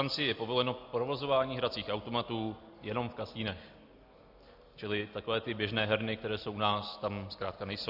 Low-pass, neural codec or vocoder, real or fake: 5.4 kHz; none; real